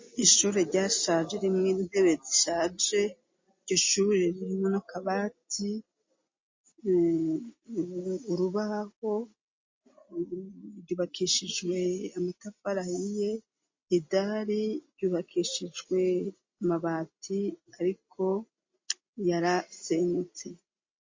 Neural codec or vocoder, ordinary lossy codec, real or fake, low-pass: none; MP3, 32 kbps; real; 7.2 kHz